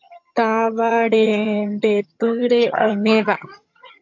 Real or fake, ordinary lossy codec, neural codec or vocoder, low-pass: fake; MP3, 48 kbps; vocoder, 22.05 kHz, 80 mel bands, HiFi-GAN; 7.2 kHz